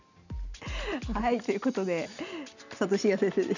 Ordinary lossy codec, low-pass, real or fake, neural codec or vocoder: none; 7.2 kHz; real; none